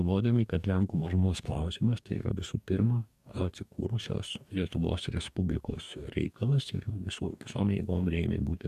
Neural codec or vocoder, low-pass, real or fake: codec, 44.1 kHz, 2.6 kbps, DAC; 14.4 kHz; fake